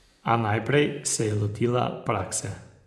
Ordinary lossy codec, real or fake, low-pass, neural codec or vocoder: none; fake; none; vocoder, 24 kHz, 100 mel bands, Vocos